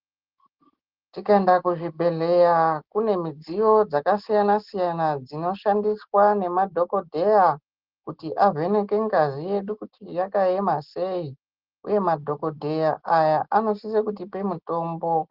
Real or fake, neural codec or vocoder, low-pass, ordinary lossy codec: real; none; 5.4 kHz; Opus, 16 kbps